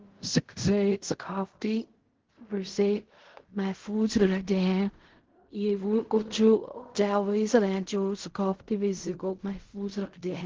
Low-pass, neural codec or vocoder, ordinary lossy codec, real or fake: 7.2 kHz; codec, 16 kHz in and 24 kHz out, 0.4 kbps, LongCat-Audio-Codec, fine tuned four codebook decoder; Opus, 16 kbps; fake